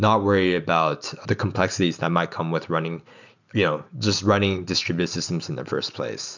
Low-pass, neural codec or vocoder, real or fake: 7.2 kHz; none; real